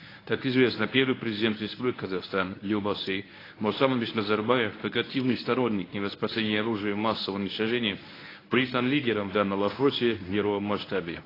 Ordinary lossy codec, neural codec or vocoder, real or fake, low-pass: AAC, 24 kbps; codec, 24 kHz, 0.9 kbps, WavTokenizer, medium speech release version 1; fake; 5.4 kHz